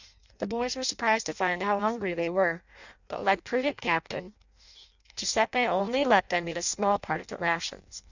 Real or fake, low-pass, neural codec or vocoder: fake; 7.2 kHz; codec, 16 kHz in and 24 kHz out, 0.6 kbps, FireRedTTS-2 codec